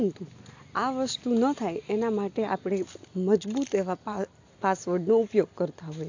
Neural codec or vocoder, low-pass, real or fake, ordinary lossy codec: none; 7.2 kHz; real; AAC, 48 kbps